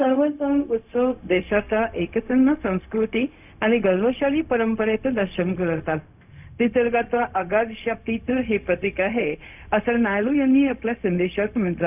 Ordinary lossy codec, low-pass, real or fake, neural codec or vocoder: none; 3.6 kHz; fake; codec, 16 kHz, 0.4 kbps, LongCat-Audio-Codec